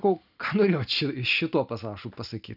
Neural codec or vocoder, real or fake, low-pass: none; real; 5.4 kHz